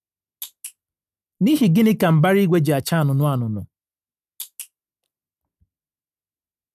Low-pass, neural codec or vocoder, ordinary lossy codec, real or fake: 14.4 kHz; none; none; real